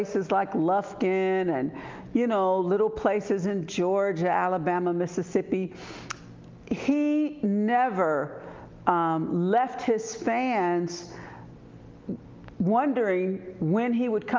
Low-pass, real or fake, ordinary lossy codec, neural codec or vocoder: 7.2 kHz; real; Opus, 24 kbps; none